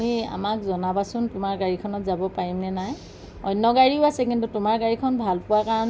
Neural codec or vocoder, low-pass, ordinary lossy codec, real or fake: none; none; none; real